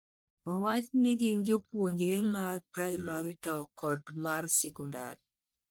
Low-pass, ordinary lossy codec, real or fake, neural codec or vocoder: none; none; fake; codec, 44.1 kHz, 1.7 kbps, Pupu-Codec